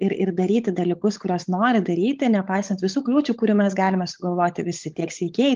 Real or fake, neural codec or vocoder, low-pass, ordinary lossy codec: fake; codec, 16 kHz, 16 kbps, FunCodec, trained on LibriTTS, 50 frames a second; 7.2 kHz; Opus, 24 kbps